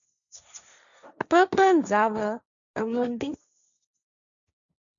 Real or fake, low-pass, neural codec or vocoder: fake; 7.2 kHz; codec, 16 kHz, 1.1 kbps, Voila-Tokenizer